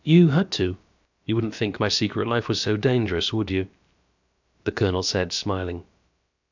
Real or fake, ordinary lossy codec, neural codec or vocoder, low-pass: fake; MP3, 64 kbps; codec, 16 kHz, about 1 kbps, DyCAST, with the encoder's durations; 7.2 kHz